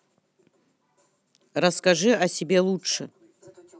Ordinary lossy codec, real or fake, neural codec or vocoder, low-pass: none; real; none; none